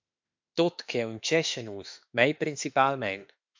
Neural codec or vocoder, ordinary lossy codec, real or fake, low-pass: autoencoder, 48 kHz, 32 numbers a frame, DAC-VAE, trained on Japanese speech; MP3, 64 kbps; fake; 7.2 kHz